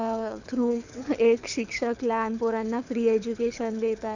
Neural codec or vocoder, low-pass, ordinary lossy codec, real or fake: codec, 24 kHz, 6 kbps, HILCodec; 7.2 kHz; none; fake